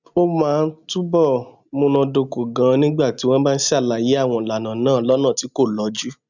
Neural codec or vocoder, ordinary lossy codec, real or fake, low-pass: none; none; real; 7.2 kHz